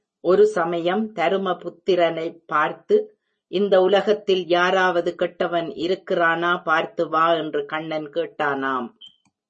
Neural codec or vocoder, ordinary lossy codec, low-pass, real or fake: none; MP3, 32 kbps; 10.8 kHz; real